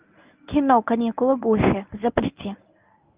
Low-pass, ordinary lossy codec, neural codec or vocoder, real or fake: 3.6 kHz; Opus, 24 kbps; codec, 24 kHz, 0.9 kbps, WavTokenizer, medium speech release version 1; fake